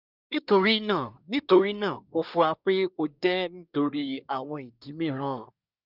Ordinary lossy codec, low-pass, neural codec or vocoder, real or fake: none; 5.4 kHz; codec, 24 kHz, 1 kbps, SNAC; fake